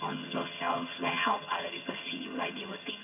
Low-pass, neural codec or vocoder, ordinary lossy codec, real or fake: 3.6 kHz; vocoder, 22.05 kHz, 80 mel bands, HiFi-GAN; AAC, 32 kbps; fake